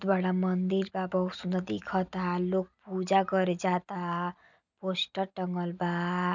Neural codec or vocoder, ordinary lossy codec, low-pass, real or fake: none; none; 7.2 kHz; real